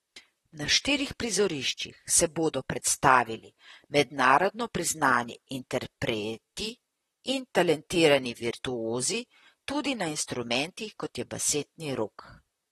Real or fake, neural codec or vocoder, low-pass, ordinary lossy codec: fake; vocoder, 44.1 kHz, 128 mel bands, Pupu-Vocoder; 19.8 kHz; AAC, 32 kbps